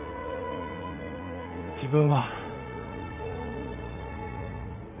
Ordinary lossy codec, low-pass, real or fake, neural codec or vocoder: none; 3.6 kHz; fake; vocoder, 44.1 kHz, 80 mel bands, Vocos